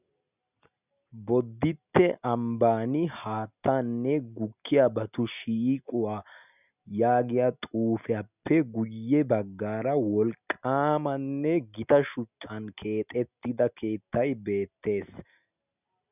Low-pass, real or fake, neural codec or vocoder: 3.6 kHz; real; none